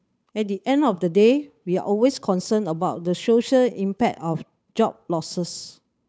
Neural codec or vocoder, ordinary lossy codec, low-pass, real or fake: codec, 16 kHz, 8 kbps, FunCodec, trained on Chinese and English, 25 frames a second; none; none; fake